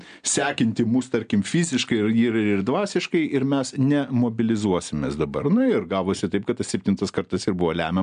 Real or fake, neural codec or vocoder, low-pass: real; none; 9.9 kHz